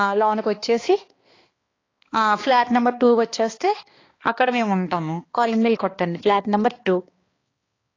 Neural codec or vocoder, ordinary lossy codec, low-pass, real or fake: codec, 16 kHz, 2 kbps, X-Codec, HuBERT features, trained on balanced general audio; AAC, 32 kbps; 7.2 kHz; fake